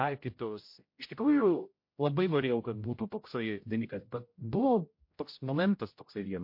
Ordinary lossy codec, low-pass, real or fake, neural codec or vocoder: MP3, 32 kbps; 5.4 kHz; fake; codec, 16 kHz, 0.5 kbps, X-Codec, HuBERT features, trained on general audio